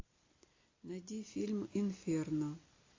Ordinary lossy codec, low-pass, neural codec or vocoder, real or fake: AAC, 32 kbps; 7.2 kHz; none; real